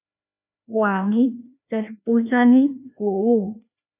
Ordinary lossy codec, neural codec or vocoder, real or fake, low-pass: AAC, 32 kbps; codec, 16 kHz, 1 kbps, FreqCodec, larger model; fake; 3.6 kHz